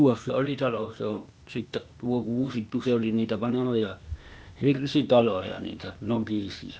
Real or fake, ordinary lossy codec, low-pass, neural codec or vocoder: fake; none; none; codec, 16 kHz, 0.8 kbps, ZipCodec